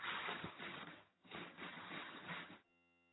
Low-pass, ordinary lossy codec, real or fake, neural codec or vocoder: 7.2 kHz; AAC, 16 kbps; fake; vocoder, 22.05 kHz, 80 mel bands, HiFi-GAN